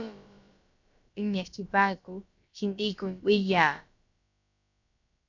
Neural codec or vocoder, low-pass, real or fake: codec, 16 kHz, about 1 kbps, DyCAST, with the encoder's durations; 7.2 kHz; fake